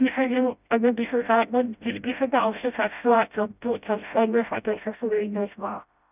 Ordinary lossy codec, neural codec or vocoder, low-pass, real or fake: none; codec, 16 kHz, 0.5 kbps, FreqCodec, smaller model; 3.6 kHz; fake